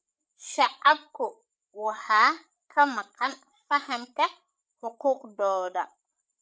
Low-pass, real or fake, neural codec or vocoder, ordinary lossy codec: none; fake; codec, 16 kHz, 8 kbps, FreqCodec, larger model; none